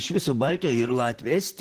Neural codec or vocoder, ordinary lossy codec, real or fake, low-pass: vocoder, 44.1 kHz, 128 mel bands, Pupu-Vocoder; Opus, 16 kbps; fake; 14.4 kHz